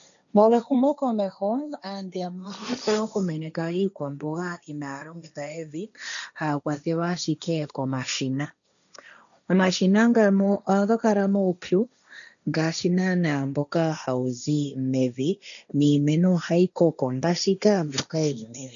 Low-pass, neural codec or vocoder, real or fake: 7.2 kHz; codec, 16 kHz, 1.1 kbps, Voila-Tokenizer; fake